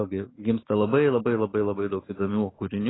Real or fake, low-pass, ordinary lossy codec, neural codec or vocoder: fake; 7.2 kHz; AAC, 16 kbps; autoencoder, 48 kHz, 128 numbers a frame, DAC-VAE, trained on Japanese speech